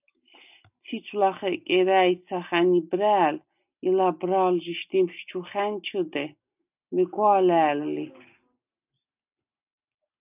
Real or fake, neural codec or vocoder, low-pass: real; none; 3.6 kHz